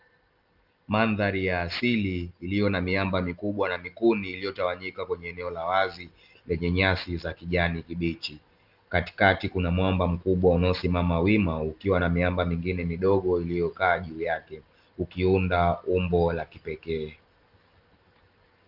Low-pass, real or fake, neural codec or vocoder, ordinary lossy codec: 5.4 kHz; real; none; Opus, 24 kbps